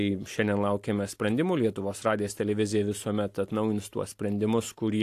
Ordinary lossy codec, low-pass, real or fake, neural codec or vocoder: AAC, 64 kbps; 14.4 kHz; real; none